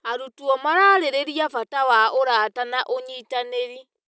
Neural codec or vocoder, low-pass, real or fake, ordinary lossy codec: none; none; real; none